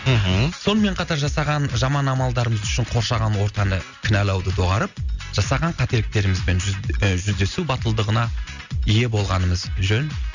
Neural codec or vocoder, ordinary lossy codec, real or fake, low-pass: none; none; real; 7.2 kHz